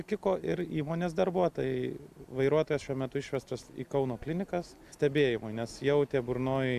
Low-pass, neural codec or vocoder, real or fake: 14.4 kHz; none; real